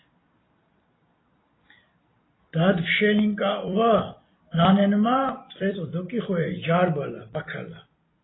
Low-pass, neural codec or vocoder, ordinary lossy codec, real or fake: 7.2 kHz; vocoder, 44.1 kHz, 128 mel bands every 256 samples, BigVGAN v2; AAC, 16 kbps; fake